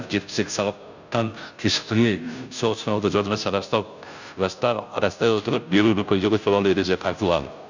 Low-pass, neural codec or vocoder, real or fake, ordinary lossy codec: 7.2 kHz; codec, 16 kHz, 0.5 kbps, FunCodec, trained on Chinese and English, 25 frames a second; fake; none